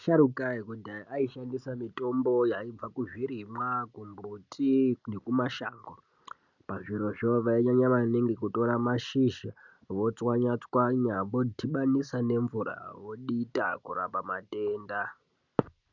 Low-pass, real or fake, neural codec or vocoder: 7.2 kHz; real; none